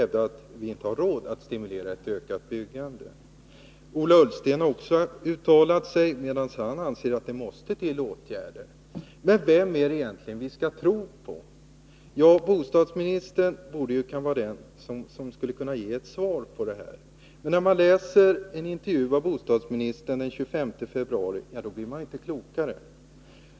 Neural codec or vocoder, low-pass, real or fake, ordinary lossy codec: none; none; real; none